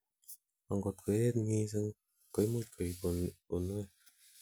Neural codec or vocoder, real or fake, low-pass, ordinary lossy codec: none; real; none; none